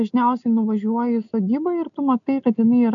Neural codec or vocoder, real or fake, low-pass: none; real; 7.2 kHz